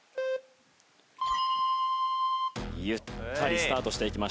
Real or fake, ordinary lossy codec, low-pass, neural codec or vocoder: real; none; none; none